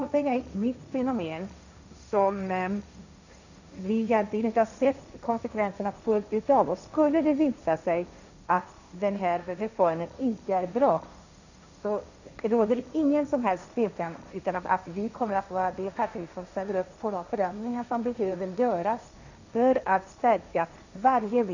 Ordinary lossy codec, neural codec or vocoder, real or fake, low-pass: none; codec, 16 kHz, 1.1 kbps, Voila-Tokenizer; fake; 7.2 kHz